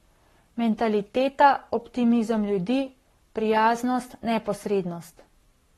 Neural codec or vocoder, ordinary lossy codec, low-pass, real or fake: codec, 44.1 kHz, 7.8 kbps, Pupu-Codec; AAC, 32 kbps; 19.8 kHz; fake